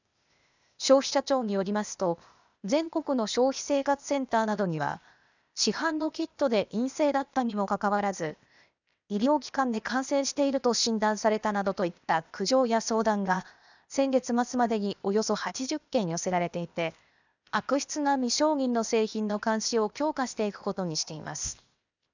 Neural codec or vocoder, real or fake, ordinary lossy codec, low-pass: codec, 16 kHz, 0.8 kbps, ZipCodec; fake; none; 7.2 kHz